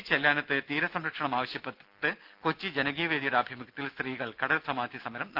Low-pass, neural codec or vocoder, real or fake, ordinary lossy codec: 5.4 kHz; none; real; Opus, 32 kbps